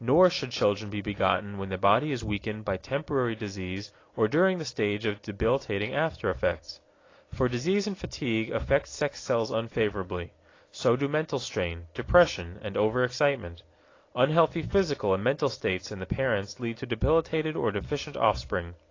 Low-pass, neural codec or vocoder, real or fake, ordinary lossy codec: 7.2 kHz; none; real; AAC, 32 kbps